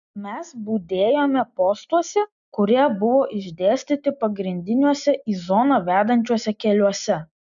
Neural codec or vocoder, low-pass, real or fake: none; 7.2 kHz; real